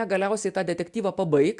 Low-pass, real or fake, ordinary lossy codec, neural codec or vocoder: 10.8 kHz; real; MP3, 96 kbps; none